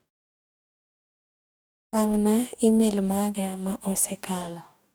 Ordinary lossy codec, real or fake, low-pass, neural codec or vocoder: none; fake; none; codec, 44.1 kHz, 2.6 kbps, DAC